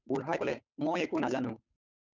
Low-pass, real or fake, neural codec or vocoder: 7.2 kHz; fake; codec, 16 kHz, 8 kbps, FunCodec, trained on Chinese and English, 25 frames a second